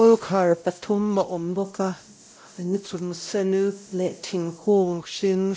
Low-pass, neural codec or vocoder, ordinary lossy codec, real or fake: none; codec, 16 kHz, 0.5 kbps, X-Codec, WavLM features, trained on Multilingual LibriSpeech; none; fake